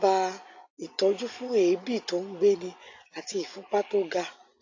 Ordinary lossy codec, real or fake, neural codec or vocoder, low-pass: AAC, 48 kbps; fake; vocoder, 24 kHz, 100 mel bands, Vocos; 7.2 kHz